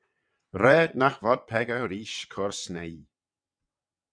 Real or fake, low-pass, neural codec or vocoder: fake; 9.9 kHz; vocoder, 22.05 kHz, 80 mel bands, WaveNeXt